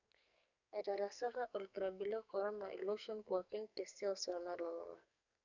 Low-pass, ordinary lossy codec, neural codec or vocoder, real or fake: 7.2 kHz; none; codec, 44.1 kHz, 2.6 kbps, SNAC; fake